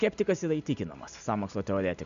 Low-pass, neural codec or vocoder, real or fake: 7.2 kHz; none; real